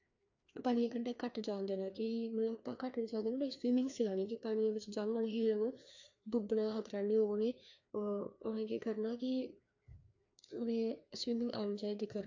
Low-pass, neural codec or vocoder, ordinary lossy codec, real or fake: 7.2 kHz; codec, 16 kHz, 2 kbps, FreqCodec, larger model; AAC, 48 kbps; fake